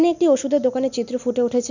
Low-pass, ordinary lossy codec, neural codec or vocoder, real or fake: 7.2 kHz; none; none; real